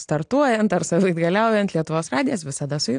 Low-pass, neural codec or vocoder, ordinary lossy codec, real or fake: 9.9 kHz; none; AAC, 64 kbps; real